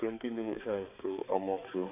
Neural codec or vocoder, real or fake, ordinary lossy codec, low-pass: codec, 16 kHz, 4 kbps, X-Codec, HuBERT features, trained on balanced general audio; fake; none; 3.6 kHz